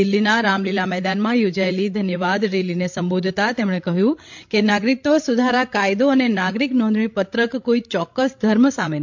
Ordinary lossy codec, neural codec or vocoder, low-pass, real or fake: MP3, 48 kbps; codec, 16 kHz, 16 kbps, FreqCodec, larger model; 7.2 kHz; fake